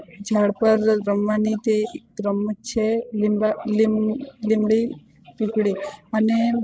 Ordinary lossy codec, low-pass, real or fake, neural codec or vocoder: none; none; fake; codec, 16 kHz, 16 kbps, FreqCodec, larger model